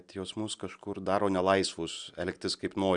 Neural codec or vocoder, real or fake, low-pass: none; real; 9.9 kHz